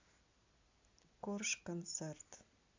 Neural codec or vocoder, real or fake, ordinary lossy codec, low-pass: none; real; AAC, 48 kbps; 7.2 kHz